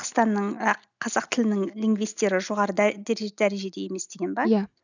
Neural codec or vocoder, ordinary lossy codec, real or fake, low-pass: none; none; real; 7.2 kHz